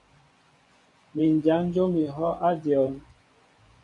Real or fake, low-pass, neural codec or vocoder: fake; 10.8 kHz; vocoder, 24 kHz, 100 mel bands, Vocos